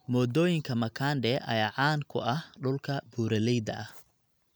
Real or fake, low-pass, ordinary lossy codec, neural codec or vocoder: real; none; none; none